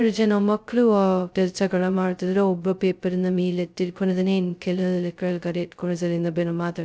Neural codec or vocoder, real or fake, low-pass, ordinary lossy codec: codec, 16 kHz, 0.2 kbps, FocalCodec; fake; none; none